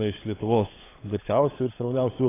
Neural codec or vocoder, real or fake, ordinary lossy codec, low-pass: codec, 16 kHz, 16 kbps, FunCodec, trained on LibriTTS, 50 frames a second; fake; AAC, 16 kbps; 3.6 kHz